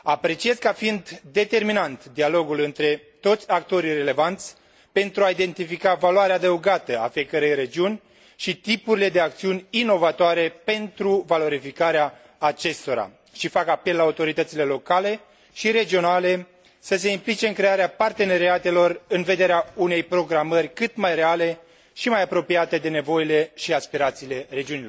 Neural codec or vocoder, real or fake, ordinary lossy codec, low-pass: none; real; none; none